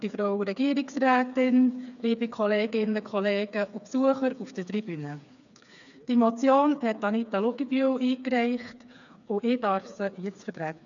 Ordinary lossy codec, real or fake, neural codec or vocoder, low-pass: none; fake; codec, 16 kHz, 4 kbps, FreqCodec, smaller model; 7.2 kHz